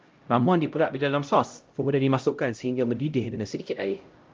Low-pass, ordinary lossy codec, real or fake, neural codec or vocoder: 7.2 kHz; Opus, 24 kbps; fake; codec, 16 kHz, 0.5 kbps, X-Codec, HuBERT features, trained on LibriSpeech